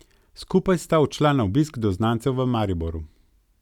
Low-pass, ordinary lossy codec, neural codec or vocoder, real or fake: 19.8 kHz; none; none; real